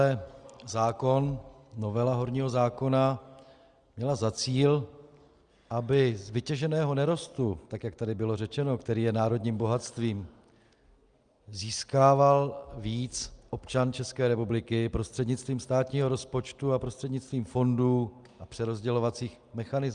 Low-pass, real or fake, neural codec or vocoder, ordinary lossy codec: 9.9 kHz; real; none; Opus, 32 kbps